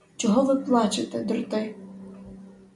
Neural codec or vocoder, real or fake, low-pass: none; real; 10.8 kHz